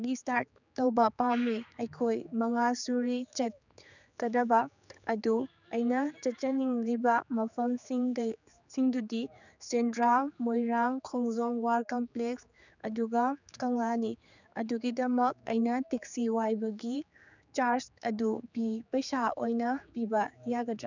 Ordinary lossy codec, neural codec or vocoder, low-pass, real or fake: none; codec, 16 kHz, 4 kbps, X-Codec, HuBERT features, trained on general audio; 7.2 kHz; fake